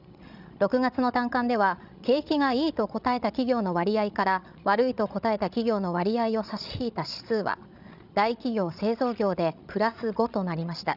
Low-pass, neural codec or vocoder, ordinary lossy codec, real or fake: 5.4 kHz; codec, 16 kHz, 16 kbps, FreqCodec, larger model; none; fake